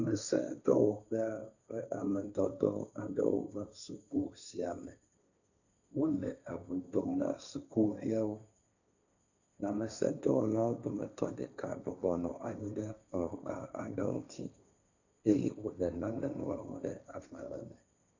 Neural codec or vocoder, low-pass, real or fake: codec, 16 kHz, 1.1 kbps, Voila-Tokenizer; 7.2 kHz; fake